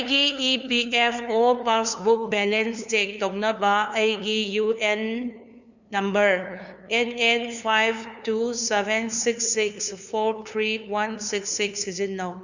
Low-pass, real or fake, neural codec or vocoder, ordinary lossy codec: 7.2 kHz; fake; codec, 16 kHz, 2 kbps, FunCodec, trained on LibriTTS, 25 frames a second; none